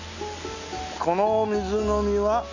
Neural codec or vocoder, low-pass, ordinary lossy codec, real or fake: none; 7.2 kHz; none; real